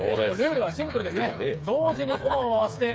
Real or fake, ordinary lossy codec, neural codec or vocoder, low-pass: fake; none; codec, 16 kHz, 4 kbps, FreqCodec, smaller model; none